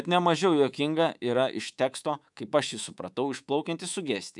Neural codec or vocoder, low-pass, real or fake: codec, 24 kHz, 3.1 kbps, DualCodec; 10.8 kHz; fake